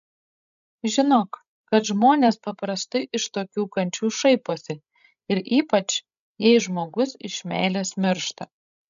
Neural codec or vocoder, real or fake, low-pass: codec, 16 kHz, 16 kbps, FreqCodec, larger model; fake; 7.2 kHz